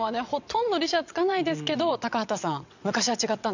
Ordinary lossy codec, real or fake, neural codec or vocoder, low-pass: none; fake; vocoder, 22.05 kHz, 80 mel bands, WaveNeXt; 7.2 kHz